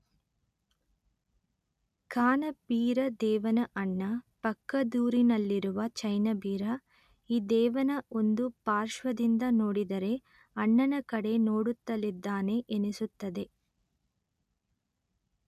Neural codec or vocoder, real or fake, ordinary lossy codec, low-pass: none; real; none; 14.4 kHz